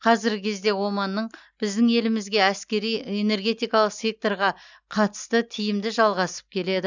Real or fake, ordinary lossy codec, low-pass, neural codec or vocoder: real; none; 7.2 kHz; none